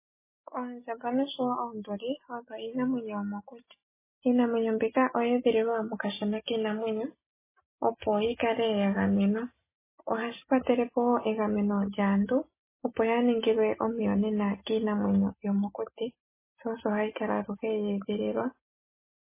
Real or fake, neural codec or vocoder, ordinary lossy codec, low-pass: real; none; MP3, 16 kbps; 3.6 kHz